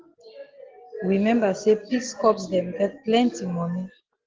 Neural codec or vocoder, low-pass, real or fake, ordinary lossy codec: none; 7.2 kHz; real; Opus, 16 kbps